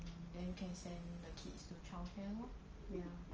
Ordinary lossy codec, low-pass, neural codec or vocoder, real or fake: Opus, 24 kbps; 7.2 kHz; none; real